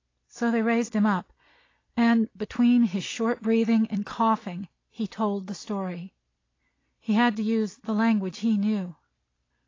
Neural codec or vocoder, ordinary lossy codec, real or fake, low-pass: none; AAC, 32 kbps; real; 7.2 kHz